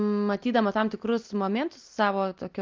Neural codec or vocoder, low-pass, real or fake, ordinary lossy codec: none; 7.2 kHz; real; Opus, 32 kbps